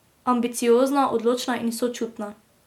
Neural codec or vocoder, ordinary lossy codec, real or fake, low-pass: none; MP3, 96 kbps; real; 19.8 kHz